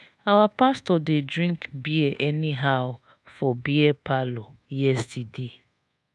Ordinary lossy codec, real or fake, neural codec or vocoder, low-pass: none; fake; codec, 24 kHz, 1.2 kbps, DualCodec; none